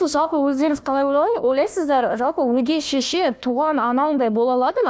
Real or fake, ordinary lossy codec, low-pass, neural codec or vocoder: fake; none; none; codec, 16 kHz, 1 kbps, FunCodec, trained on Chinese and English, 50 frames a second